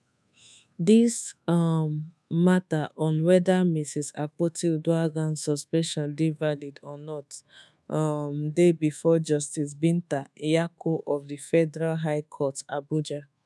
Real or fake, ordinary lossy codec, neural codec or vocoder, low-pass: fake; none; codec, 24 kHz, 1.2 kbps, DualCodec; none